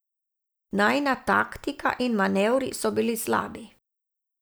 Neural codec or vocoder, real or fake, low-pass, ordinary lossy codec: none; real; none; none